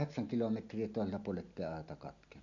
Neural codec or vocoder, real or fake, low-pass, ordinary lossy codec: none; real; 7.2 kHz; none